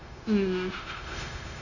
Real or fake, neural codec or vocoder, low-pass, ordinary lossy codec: fake; codec, 16 kHz, 0.9 kbps, LongCat-Audio-Codec; 7.2 kHz; none